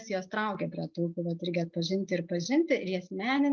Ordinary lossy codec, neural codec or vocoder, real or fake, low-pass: Opus, 24 kbps; none; real; 7.2 kHz